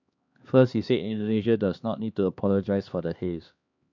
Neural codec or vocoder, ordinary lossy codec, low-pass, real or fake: codec, 16 kHz, 2 kbps, X-Codec, HuBERT features, trained on LibriSpeech; none; 7.2 kHz; fake